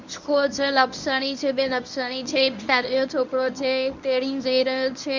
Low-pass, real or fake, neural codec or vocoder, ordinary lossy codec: 7.2 kHz; fake; codec, 24 kHz, 0.9 kbps, WavTokenizer, medium speech release version 1; none